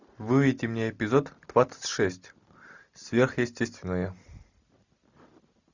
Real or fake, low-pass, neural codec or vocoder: real; 7.2 kHz; none